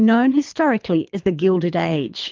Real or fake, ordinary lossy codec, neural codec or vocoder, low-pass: fake; Opus, 32 kbps; codec, 24 kHz, 3 kbps, HILCodec; 7.2 kHz